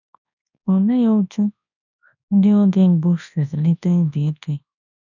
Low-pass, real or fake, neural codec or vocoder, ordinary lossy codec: 7.2 kHz; fake; codec, 24 kHz, 0.9 kbps, WavTokenizer, large speech release; none